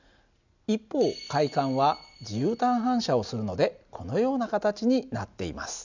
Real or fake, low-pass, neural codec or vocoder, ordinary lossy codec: real; 7.2 kHz; none; none